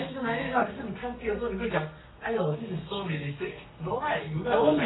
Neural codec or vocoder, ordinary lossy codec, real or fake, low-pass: codec, 32 kHz, 1.9 kbps, SNAC; AAC, 16 kbps; fake; 7.2 kHz